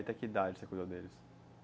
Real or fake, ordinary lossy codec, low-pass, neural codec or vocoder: real; none; none; none